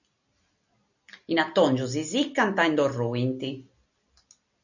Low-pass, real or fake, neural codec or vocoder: 7.2 kHz; real; none